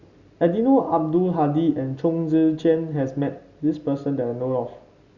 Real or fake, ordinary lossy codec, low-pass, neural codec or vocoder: real; Opus, 64 kbps; 7.2 kHz; none